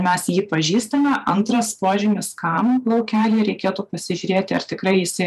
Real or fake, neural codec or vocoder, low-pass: fake; vocoder, 44.1 kHz, 128 mel bands every 512 samples, BigVGAN v2; 14.4 kHz